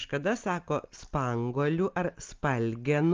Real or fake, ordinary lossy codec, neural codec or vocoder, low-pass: real; Opus, 24 kbps; none; 7.2 kHz